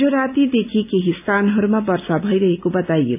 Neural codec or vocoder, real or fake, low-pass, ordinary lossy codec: none; real; 3.6 kHz; none